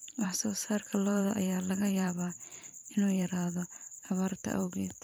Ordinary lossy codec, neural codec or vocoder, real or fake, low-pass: none; vocoder, 44.1 kHz, 128 mel bands every 512 samples, BigVGAN v2; fake; none